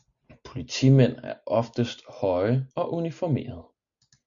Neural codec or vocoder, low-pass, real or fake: none; 7.2 kHz; real